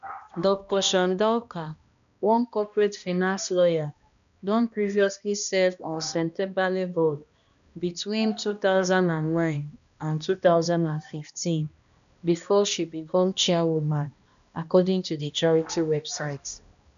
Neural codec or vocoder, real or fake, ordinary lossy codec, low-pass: codec, 16 kHz, 1 kbps, X-Codec, HuBERT features, trained on balanced general audio; fake; none; 7.2 kHz